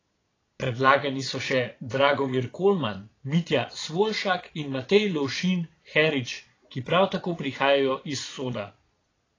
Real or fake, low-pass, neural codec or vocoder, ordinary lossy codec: fake; 7.2 kHz; vocoder, 22.05 kHz, 80 mel bands, Vocos; AAC, 32 kbps